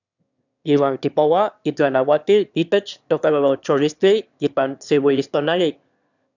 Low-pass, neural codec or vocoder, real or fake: 7.2 kHz; autoencoder, 22.05 kHz, a latent of 192 numbers a frame, VITS, trained on one speaker; fake